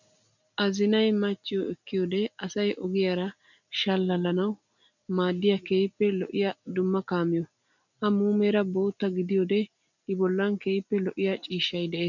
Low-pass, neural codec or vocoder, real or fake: 7.2 kHz; none; real